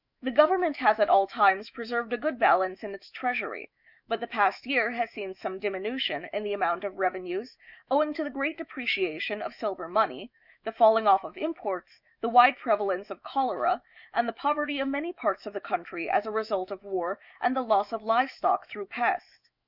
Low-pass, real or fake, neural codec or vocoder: 5.4 kHz; fake; autoencoder, 48 kHz, 128 numbers a frame, DAC-VAE, trained on Japanese speech